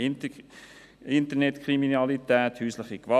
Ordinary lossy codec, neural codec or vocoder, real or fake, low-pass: none; none; real; 14.4 kHz